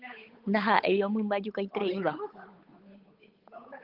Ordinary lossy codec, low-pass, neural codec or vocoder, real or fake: Opus, 32 kbps; 5.4 kHz; codec, 16 kHz, 8 kbps, FunCodec, trained on Chinese and English, 25 frames a second; fake